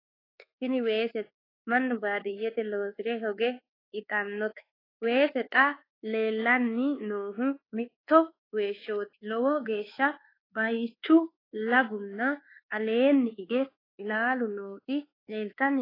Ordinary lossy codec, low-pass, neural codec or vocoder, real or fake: AAC, 24 kbps; 5.4 kHz; codec, 24 kHz, 1.2 kbps, DualCodec; fake